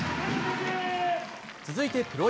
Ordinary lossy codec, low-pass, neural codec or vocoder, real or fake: none; none; none; real